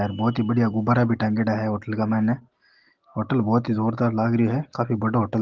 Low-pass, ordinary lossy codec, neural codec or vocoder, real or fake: 7.2 kHz; Opus, 16 kbps; none; real